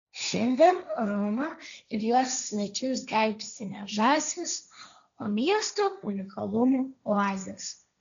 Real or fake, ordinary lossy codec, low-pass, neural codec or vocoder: fake; MP3, 96 kbps; 7.2 kHz; codec, 16 kHz, 1.1 kbps, Voila-Tokenizer